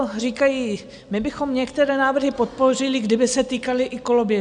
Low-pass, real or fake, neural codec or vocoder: 9.9 kHz; real; none